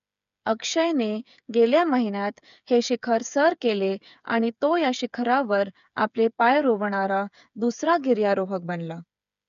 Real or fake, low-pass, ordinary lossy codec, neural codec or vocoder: fake; 7.2 kHz; none; codec, 16 kHz, 8 kbps, FreqCodec, smaller model